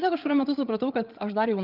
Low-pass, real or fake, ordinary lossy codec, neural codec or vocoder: 5.4 kHz; fake; Opus, 24 kbps; codec, 16 kHz, 8 kbps, FreqCodec, larger model